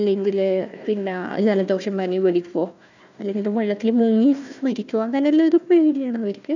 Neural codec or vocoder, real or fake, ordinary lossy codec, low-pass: codec, 16 kHz, 1 kbps, FunCodec, trained on Chinese and English, 50 frames a second; fake; none; 7.2 kHz